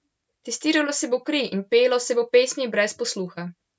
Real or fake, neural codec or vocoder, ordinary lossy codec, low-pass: real; none; none; none